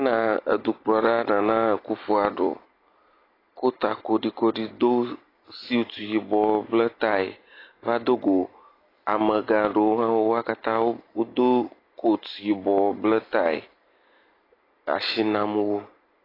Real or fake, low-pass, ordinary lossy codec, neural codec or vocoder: real; 5.4 kHz; AAC, 24 kbps; none